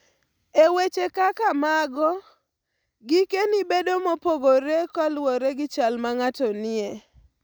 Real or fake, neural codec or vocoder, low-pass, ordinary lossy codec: real; none; none; none